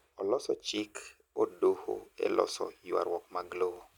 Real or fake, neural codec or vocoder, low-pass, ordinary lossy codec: real; none; 19.8 kHz; none